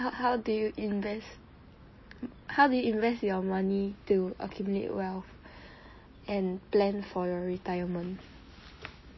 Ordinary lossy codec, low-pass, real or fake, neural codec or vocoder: MP3, 24 kbps; 7.2 kHz; real; none